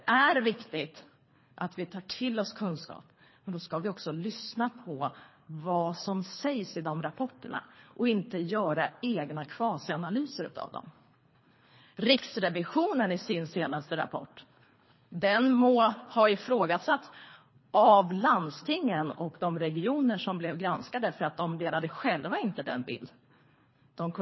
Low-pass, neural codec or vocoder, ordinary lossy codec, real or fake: 7.2 kHz; codec, 24 kHz, 3 kbps, HILCodec; MP3, 24 kbps; fake